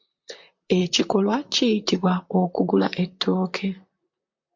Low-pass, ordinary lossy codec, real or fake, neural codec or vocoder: 7.2 kHz; MP3, 48 kbps; fake; vocoder, 44.1 kHz, 128 mel bands, Pupu-Vocoder